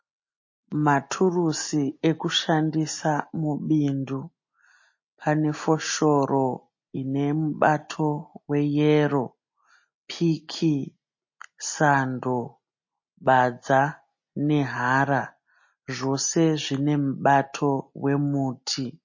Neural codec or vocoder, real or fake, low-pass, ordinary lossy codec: none; real; 7.2 kHz; MP3, 32 kbps